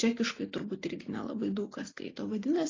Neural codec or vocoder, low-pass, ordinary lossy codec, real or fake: none; 7.2 kHz; AAC, 32 kbps; real